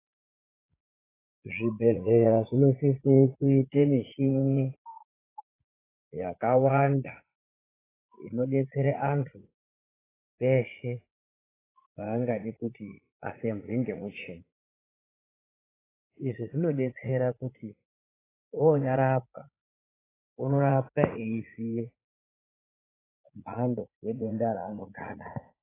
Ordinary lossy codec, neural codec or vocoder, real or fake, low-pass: AAC, 16 kbps; vocoder, 44.1 kHz, 80 mel bands, Vocos; fake; 3.6 kHz